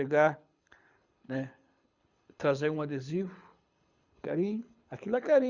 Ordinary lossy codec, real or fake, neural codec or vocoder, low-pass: Opus, 64 kbps; fake; codec, 24 kHz, 6 kbps, HILCodec; 7.2 kHz